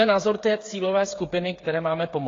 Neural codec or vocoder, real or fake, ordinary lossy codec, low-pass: codec, 16 kHz, 8 kbps, FreqCodec, smaller model; fake; AAC, 32 kbps; 7.2 kHz